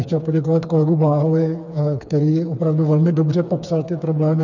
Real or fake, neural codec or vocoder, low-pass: fake; codec, 16 kHz, 4 kbps, FreqCodec, smaller model; 7.2 kHz